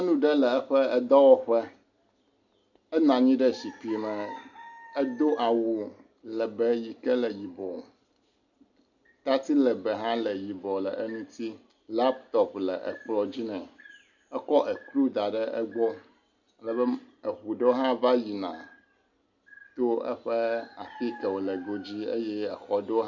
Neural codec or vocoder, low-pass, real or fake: none; 7.2 kHz; real